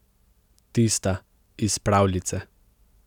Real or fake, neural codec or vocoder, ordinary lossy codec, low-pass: real; none; none; 19.8 kHz